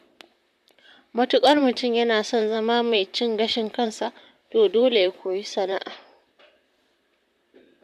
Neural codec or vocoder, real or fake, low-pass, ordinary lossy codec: vocoder, 44.1 kHz, 128 mel bands, Pupu-Vocoder; fake; 14.4 kHz; AAC, 96 kbps